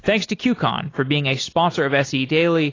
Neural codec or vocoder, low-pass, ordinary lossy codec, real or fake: none; 7.2 kHz; AAC, 32 kbps; real